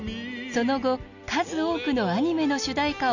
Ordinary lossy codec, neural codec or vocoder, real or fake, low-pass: none; none; real; 7.2 kHz